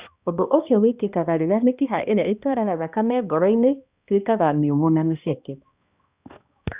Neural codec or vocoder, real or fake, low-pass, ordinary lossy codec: codec, 16 kHz, 1 kbps, X-Codec, HuBERT features, trained on balanced general audio; fake; 3.6 kHz; Opus, 64 kbps